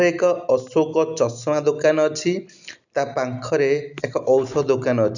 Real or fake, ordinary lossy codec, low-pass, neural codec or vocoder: real; none; 7.2 kHz; none